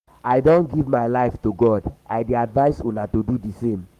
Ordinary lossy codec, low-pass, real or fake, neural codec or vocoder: Opus, 32 kbps; 14.4 kHz; fake; codec, 44.1 kHz, 7.8 kbps, Pupu-Codec